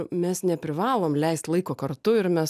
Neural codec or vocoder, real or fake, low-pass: none; real; 14.4 kHz